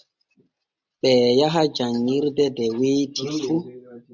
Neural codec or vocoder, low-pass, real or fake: none; 7.2 kHz; real